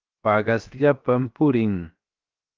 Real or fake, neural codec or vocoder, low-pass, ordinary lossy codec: fake; codec, 16 kHz, 0.7 kbps, FocalCodec; 7.2 kHz; Opus, 32 kbps